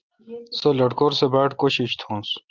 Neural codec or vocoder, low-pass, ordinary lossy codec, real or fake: none; 7.2 kHz; Opus, 24 kbps; real